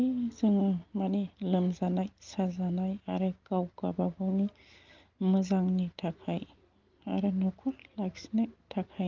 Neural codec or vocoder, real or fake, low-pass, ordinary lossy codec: none; real; 7.2 kHz; Opus, 32 kbps